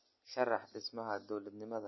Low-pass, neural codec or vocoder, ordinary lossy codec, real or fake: 7.2 kHz; none; MP3, 24 kbps; real